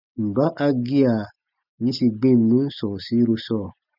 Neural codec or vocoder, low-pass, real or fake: none; 5.4 kHz; real